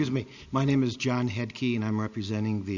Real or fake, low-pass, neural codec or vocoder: real; 7.2 kHz; none